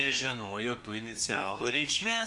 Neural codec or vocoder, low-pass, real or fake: codec, 24 kHz, 1 kbps, SNAC; 10.8 kHz; fake